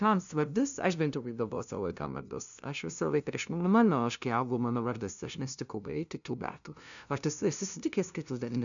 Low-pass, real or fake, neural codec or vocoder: 7.2 kHz; fake; codec, 16 kHz, 0.5 kbps, FunCodec, trained on LibriTTS, 25 frames a second